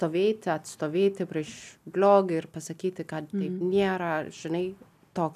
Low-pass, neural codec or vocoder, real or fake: 14.4 kHz; none; real